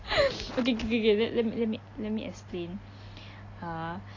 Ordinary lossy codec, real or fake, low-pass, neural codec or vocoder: AAC, 32 kbps; real; 7.2 kHz; none